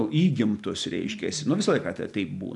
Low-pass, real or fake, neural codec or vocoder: 10.8 kHz; real; none